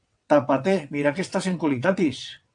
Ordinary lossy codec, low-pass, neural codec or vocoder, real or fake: AAC, 64 kbps; 9.9 kHz; vocoder, 22.05 kHz, 80 mel bands, WaveNeXt; fake